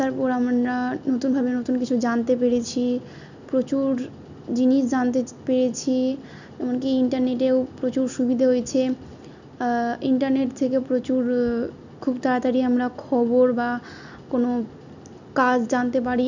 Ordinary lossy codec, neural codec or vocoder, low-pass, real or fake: none; none; 7.2 kHz; real